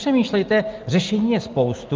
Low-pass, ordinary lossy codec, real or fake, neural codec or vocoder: 7.2 kHz; Opus, 24 kbps; real; none